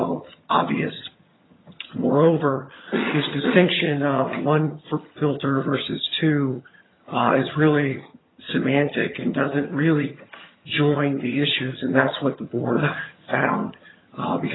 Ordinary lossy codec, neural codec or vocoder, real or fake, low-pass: AAC, 16 kbps; vocoder, 22.05 kHz, 80 mel bands, HiFi-GAN; fake; 7.2 kHz